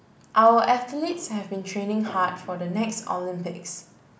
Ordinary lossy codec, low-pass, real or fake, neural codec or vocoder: none; none; real; none